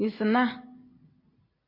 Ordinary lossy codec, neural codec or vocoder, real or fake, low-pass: MP3, 32 kbps; none; real; 5.4 kHz